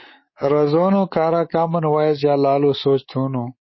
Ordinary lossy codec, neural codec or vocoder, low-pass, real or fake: MP3, 24 kbps; codec, 24 kHz, 3.1 kbps, DualCodec; 7.2 kHz; fake